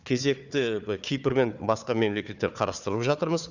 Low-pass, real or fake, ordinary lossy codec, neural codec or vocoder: 7.2 kHz; fake; none; codec, 16 kHz, 4 kbps, FunCodec, trained on Chinese and English, 50 frames a second